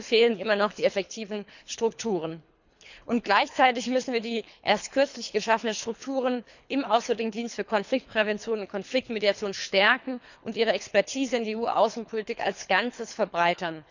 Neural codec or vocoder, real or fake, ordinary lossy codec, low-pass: codec, 24 kHz, 3 kbps, HILCodec; fake; none; 7.2 kHz